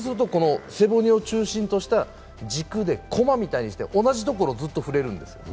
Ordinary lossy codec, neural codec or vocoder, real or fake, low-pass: none; none; real; none